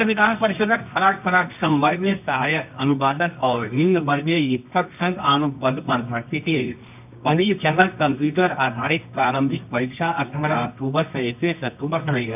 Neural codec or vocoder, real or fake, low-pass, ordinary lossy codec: codec, 24 kHz, 0.9 kbps, WavTokenizer, medium music audio release; fake; 3.6 kHz; none